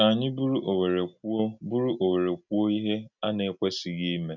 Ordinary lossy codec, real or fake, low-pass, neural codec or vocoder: none; real; 7.2 kHz; none